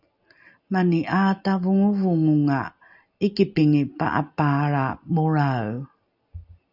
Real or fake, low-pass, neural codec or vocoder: real; 5.4 kHz; none